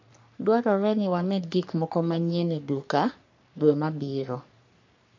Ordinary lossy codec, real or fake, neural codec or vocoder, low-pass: MP3, 48 kbps; fake; codec, 44.1 kHz, 3.4 kbps, Pupu-Codec; 7.2 kHz